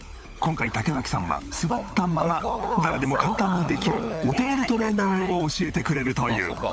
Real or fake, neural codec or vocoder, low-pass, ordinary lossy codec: fake; codec, 16 kHz, 16 kbps, FunCodec, trained on LibriTTS, 50 frames a second; none; none